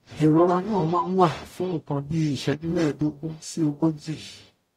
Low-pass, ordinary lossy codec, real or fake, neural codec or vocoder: 19.8 kHz; AAC, 48 kbps; fake; codec, 44.1 kHz, 0.9 kbps, DAC